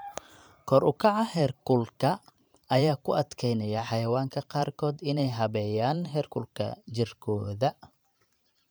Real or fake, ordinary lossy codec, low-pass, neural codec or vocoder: fake; none; none; vocoder, 44.1 kHz, 128 mel bands every 512 samples, BigVGAN v2